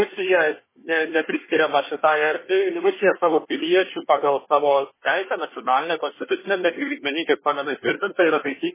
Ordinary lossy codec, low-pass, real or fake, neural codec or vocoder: MP3, 16 kbps; 3.6 kHz; fake; codec, 24 kHz, 1 kbps, SNAC